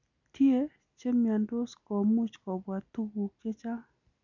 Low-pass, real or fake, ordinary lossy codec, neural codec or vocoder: 7.2 kHz; real; none; none